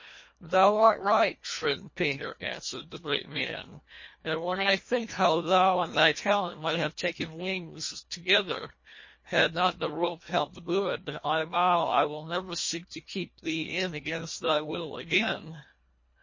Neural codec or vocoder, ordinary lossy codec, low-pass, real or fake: codec, 24 kHz, 1.5 kbps, HILCodec; MP3, 32 kbps; 7.2 kHz; fake